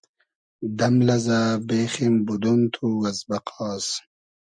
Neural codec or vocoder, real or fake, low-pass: none; real; 9.9 kHz